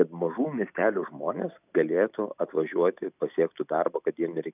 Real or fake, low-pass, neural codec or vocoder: real; 3.6 kHz; none